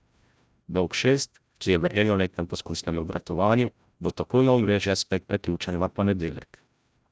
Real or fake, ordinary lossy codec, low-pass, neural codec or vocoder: fake; none; none; codec, 16 kHz, 0.5 kbps, FreqCodec, larger model